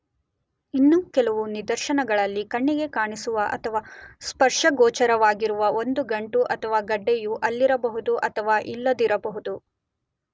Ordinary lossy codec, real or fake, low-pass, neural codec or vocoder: none; real; none; none